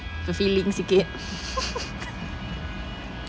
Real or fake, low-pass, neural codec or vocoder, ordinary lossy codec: real; none; none; none